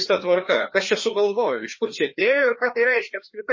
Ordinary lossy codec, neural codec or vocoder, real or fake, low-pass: MP3, 32 kbps; codec, 16 kHz, 2 kbps, FreqCodec, larger model; fake; 7.2 kHz